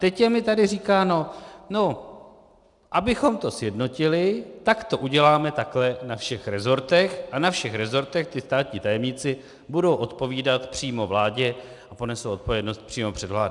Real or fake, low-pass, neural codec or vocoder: real; 10.8 kHz; none